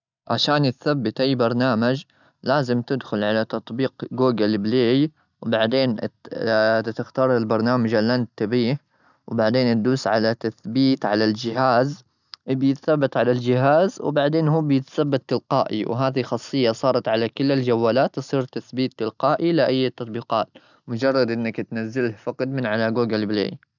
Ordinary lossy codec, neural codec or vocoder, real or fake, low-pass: none; none; real; 7.2 kHz